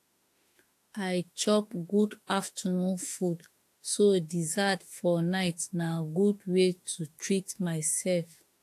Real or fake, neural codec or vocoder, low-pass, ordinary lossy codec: fake; autoencoder, 48 kHz, 32 numbers a frame, DAC-VAE, trained on Japanese speech; 14.4 kHz; AAC, 64 kbps